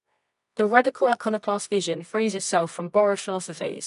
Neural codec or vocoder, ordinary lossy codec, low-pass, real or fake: codec, 24 kHz, 0.9 kbps, WavTokenizer, medium music audio release; none; 10.8 kHz; fake